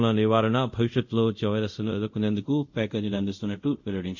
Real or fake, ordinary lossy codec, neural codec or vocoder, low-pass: fake; none; codec, 24 kHz, 0.5 kbps, DualCodec; 7.2 kHz